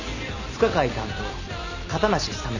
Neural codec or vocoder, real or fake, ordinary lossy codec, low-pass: none; real; none; 7.2 kHz